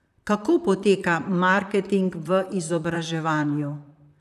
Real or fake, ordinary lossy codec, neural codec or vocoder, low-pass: fake; none; vocoder, 44.1 kHz, 128 mel bands, Pupu-Vocoder; 14.4 kHz